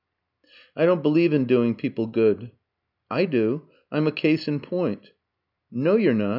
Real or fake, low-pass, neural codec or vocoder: real; 5.4 kHz; none